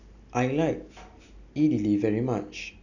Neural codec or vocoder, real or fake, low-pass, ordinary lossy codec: none; real; 7.2 kHz; none